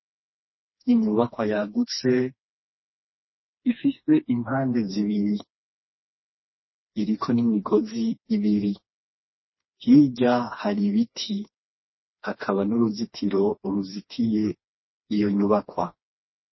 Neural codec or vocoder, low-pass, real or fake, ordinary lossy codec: codec, 16 kHz, 2 kbps, FreqCodec, smaller model; 7.2 kHz; fake; MP3, 24 kbps